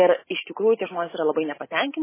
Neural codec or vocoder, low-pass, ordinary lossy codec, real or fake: none; 3.6 kHz; MP3, 16 kbps; real